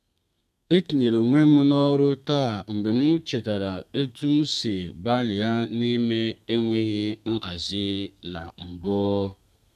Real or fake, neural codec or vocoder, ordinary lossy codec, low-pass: fake; codec, 32 kHz, 1.9 kbps, SNAC; none; 14.4 kHz